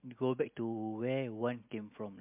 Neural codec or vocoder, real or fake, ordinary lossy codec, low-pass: none; real; MP3, 32 kbps; 3.6 kHz